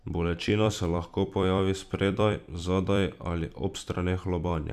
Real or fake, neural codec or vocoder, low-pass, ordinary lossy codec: fake; vocoder, 44.1 kHz, 128 mel bands every 256 samples, BigVGAN v2; 14.4 kHz; none